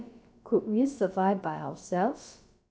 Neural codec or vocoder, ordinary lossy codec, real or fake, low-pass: codec, 16 kHz, about 1 kbps, DyCAST, with the encoder's durations; none; fake; none